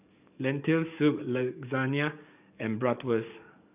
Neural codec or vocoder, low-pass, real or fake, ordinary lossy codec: codec, 44.1 kHz, 7.8 kbps, DAC; 3.6 kHz; fake; none